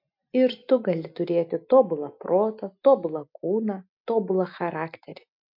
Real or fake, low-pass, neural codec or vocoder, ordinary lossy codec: real; 5.4 kHz; none; MP3, 48 kbps